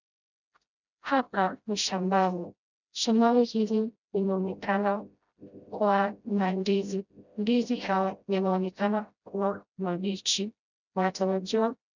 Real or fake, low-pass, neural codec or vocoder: fake; 7.2 kHz; codec, 16 kHz, 0.5 kbps, FreqCodec, smaller model